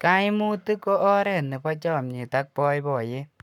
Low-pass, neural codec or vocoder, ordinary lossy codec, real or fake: 19.8 kHz; codec, 44.1 kHz, 7.8 kbps, Pupu-Codec; none; fake